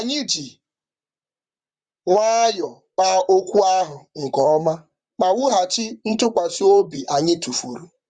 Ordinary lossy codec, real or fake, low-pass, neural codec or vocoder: Opus, 64 kbps; fake; 9.9 kHz; vocoder, 44.1 kHz, 128 mel bands, Pupu-Vocoder